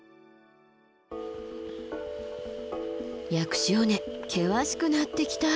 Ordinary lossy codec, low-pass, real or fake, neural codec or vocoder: none; none; real; none